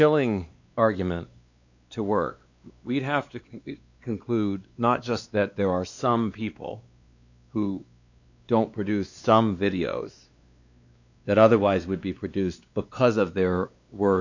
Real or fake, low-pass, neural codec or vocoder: fake; 7.2 kHz; codec, 16 kHz, 2 kbps, X-Codec, WavLM features, trained on Multilingual LibriSpeech